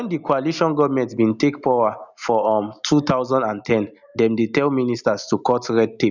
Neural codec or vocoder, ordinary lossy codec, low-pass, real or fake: none; none; 7.2 kHz; real